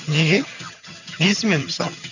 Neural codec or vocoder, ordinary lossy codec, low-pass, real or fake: vocoder, 22.05 kHz, 80 mel bands, HiFi-GAN; none; 7.2 kHz; fake